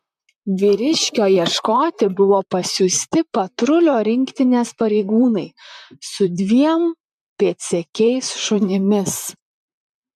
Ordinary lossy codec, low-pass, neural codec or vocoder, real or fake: MP3, 96 kbps; 14.4 kHz; vocoder, 44.1 kHz, 128 mel bands, Pupu-Vocoder; fake